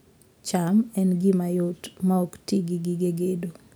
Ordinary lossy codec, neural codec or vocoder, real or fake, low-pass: none; none; real; none